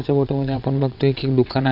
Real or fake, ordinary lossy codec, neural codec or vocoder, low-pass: fake; none; vocoder, 22.05 kHz, 80 mel bands, WaveNeXt; 5.4 kHz